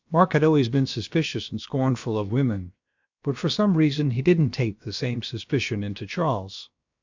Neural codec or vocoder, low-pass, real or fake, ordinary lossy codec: codec, 16 kHz, about 1 kbps, DyCAST, with the encoder's durations; 7.2 kHz; fake; MP3, 64 kbps